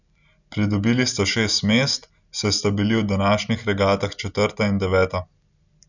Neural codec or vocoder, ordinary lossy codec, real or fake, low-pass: none; none; real; 7.2 kHz